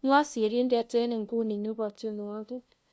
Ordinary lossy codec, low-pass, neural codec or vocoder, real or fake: none; none; codec, 16 kHz, 0.5 kbps, FunCodec, trained on LibriTTS, 25 frames a second; fake